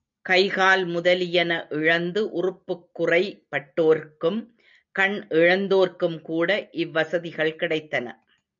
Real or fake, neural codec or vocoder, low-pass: real; none; 7.2 kHz